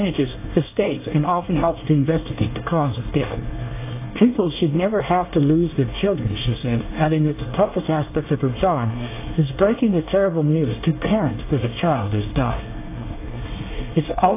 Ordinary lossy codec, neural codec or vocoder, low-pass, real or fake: AAC, 24 kbps; codec, 24 kHz, 1 kbps, SNAC; 3.6 kHz; fake